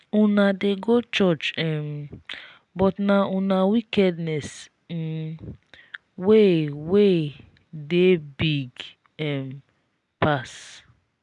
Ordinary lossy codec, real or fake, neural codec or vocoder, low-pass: none; real; none; 10.8 kHz